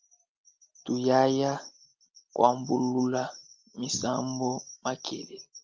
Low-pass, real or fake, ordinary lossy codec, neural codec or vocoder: 7.2 kHz; real; Opus, 32 kbps; none